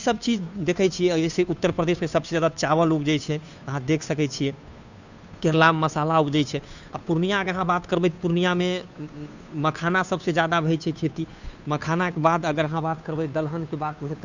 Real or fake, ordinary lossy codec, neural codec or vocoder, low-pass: fake; none; codec, 16 kHz, 2 kbps, FunCodec, trained on Chinese and English, 25 frames a second; 7.2 kHz